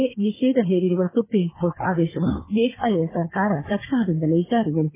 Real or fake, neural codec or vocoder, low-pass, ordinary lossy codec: fake; codec, 16 kHz, 4 kbps, FunCodec, trained on Chinese and English, 50 frames a second; 3.6 kHz; MP3, 16 kbps